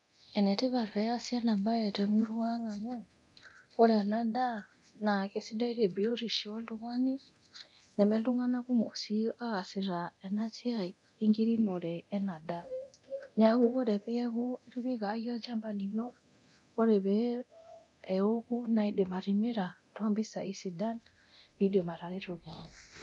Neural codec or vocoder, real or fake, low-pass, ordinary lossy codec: codec, 24 kHz, 0.9 kbps, DualCodec; fake; 10.8 kHz; none